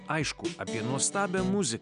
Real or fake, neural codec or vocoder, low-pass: real; none; 9.9 kHz